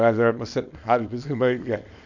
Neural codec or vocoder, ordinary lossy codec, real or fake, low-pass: codec, 24 kHz, 0.9 kbps, WavTokenizer, small release; none; fake; 7.2 kHz